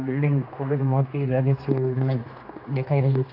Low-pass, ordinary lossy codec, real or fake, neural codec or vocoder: 5.4 kHz; AAC, 32 kbps; fake; codec, 16 kHz, 2 kbps, X-Codec, HuBERT features, trained on general audio